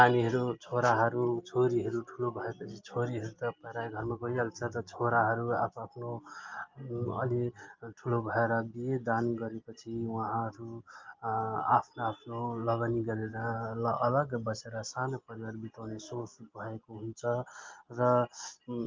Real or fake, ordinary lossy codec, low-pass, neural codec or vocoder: real; Opus, 24 kbps; 7.2 kHz; none